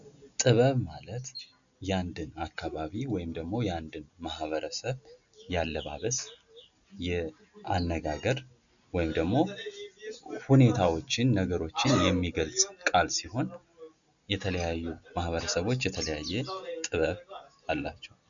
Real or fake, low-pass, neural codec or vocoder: real; 7.2 kHz; none